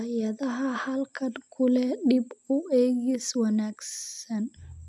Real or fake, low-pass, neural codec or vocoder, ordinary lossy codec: real; none; none; none